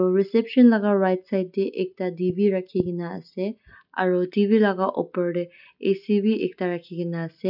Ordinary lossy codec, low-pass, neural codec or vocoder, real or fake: none; 5.4 kHz; none; real